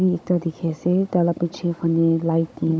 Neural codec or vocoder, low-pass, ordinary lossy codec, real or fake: codec, 16 kHz, 16 kbps, FunCodec, trained on Chinese and English, 50 frames a second; none; none; fake